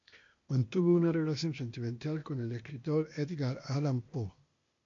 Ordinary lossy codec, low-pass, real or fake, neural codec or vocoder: MP3, 48 kbps; 7.2 kHz; fake; codec, 16 kHz, 0.8 kbps, ZipCodec